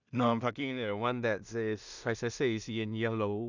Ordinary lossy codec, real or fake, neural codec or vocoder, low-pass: none; fake; codec, 16 kHz in and 24 kHz out, 0.4 kbps, LongCat-Audio-Codec, two codebook decoder; 7.2 kHz